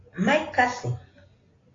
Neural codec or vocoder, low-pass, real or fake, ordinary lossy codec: none; 7.2 kHz; real; AAC, 32 kbps